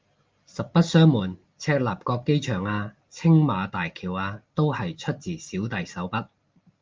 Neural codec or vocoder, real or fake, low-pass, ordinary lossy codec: none; real; 7.2 kHz; Opus, 24 kbps